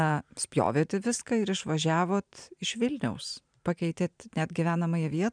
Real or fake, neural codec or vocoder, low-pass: fake; vocoder, 44.1 kHz, 128 mel bands every 512 samples, BigVGAN v2; 9.9 kHz